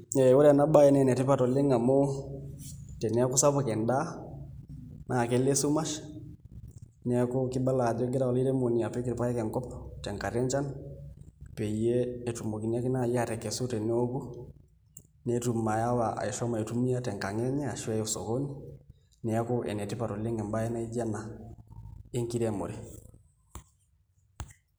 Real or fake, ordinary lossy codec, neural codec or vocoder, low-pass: real; none; none; none